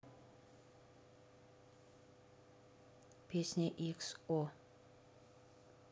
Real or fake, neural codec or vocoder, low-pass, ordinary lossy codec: real; none; none; none